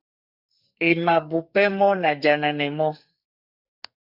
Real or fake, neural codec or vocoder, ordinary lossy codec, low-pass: fake; codec, 44.1 kHz, 2.6 kbps, SNAC; Opus, 64 kbps; 5.4 kHz